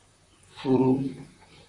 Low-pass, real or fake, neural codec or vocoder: 10.8 kHz; fake; vocoder, 44.1 kHz, 128 mel bands, Pupu-Vocoder